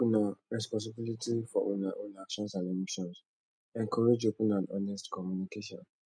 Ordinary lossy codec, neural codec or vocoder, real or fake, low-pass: none; none; real; 9.9 kHz